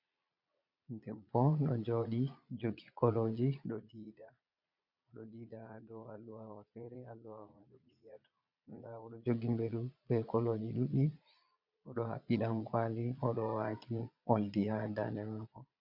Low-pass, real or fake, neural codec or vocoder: 5.4 kHz; fake; vocoder, 22.05 kHz, 80 mel bands, Vocos